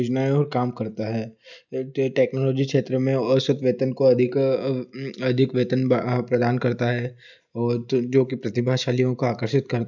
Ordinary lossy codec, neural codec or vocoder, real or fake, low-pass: none; none; real; 7.2 kHz